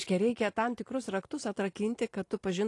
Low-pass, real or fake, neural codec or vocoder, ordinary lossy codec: 10.8 kHz; fake; vocoder, 44.1 kHz, 128 mel bands every 512 samples, BigVGAN v2; AAC, 48 kbps